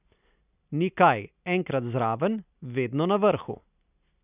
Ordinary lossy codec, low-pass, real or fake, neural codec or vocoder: none; 3.6 kHz; real; none